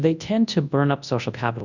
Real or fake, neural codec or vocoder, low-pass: fake; codec, 24 kHz, 0.9 kbps, WavTokenizer, large speech release; 7.2 kHz